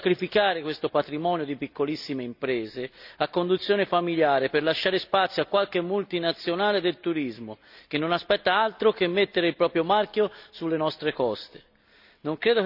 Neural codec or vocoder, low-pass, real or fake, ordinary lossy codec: none; 5.4 kHz; real; none